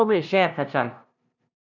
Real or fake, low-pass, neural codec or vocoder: fake; 7.2 kHz; codec, 16 kHz, 0.5 kbps, FunCodec, trained on LibriTTS, 25 frames a second